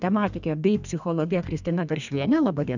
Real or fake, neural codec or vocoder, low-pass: fake; codec, 32 kHz, 1.9 kbps, SNAC; 7.2 kHz